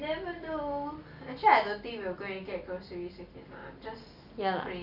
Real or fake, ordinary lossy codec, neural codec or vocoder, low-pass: real; Opus, 64 kbps; none; 5.4 kHz